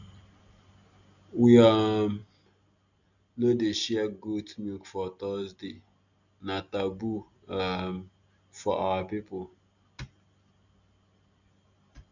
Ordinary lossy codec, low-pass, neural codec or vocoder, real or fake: none; 7.2 kHz; none; real